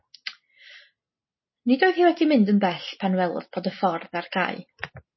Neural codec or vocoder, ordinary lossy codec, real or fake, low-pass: none; MP3, 24 kbps; real; 7.2 kHz